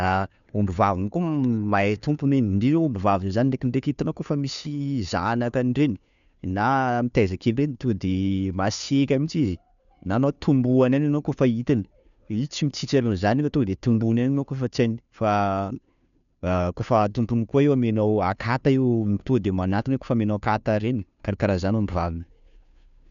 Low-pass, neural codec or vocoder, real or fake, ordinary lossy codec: 7.2 kHz; codec, 16 kHz, 2 kbps, FunCodec, trained on Chinese and English, 25 frames a second; fake; none